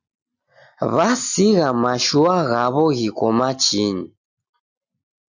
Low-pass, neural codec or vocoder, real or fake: 7.2 kHz; none; real